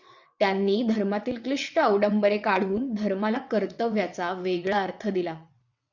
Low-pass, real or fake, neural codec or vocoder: 7.2 kHz; fake; vocoder, 22.05 kHz, 80 mel bands, WaveNeXt